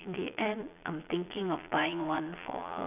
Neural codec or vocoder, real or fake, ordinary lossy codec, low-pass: vocoder, 22.05 kHz, 80 mel bands, Vocos; fake; none; 3.6 kHz